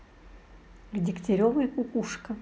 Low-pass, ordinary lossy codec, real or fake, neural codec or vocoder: none; none; real; none